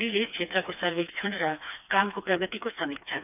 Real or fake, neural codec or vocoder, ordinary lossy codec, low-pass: fake; codec, 16 kHz, 2 kbps, FreqCodec, smaller model; none; 3.6 kHz